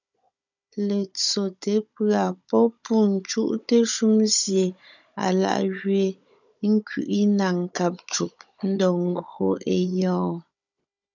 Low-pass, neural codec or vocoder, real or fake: 7.2 kHz; codec, 16 kHz, 16 kbps, FunCodec, trained on Chinese and English, 50 frames a second; fake